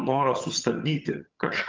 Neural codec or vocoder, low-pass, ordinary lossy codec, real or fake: vocoder, 22.05 kHz, 80 mel bands, HiFi-GAN; 7.2 kHz; Opus, 24 kbps; fake